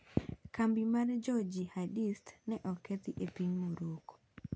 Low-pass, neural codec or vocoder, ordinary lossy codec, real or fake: none; none; none; real